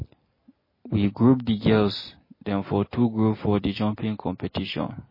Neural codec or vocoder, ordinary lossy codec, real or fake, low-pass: none; MP3, 24 kbps; real; 5.4 kHz